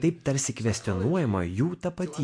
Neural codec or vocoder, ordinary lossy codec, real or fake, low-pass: none; MP3, 64 kbps; real; 9.9 kHz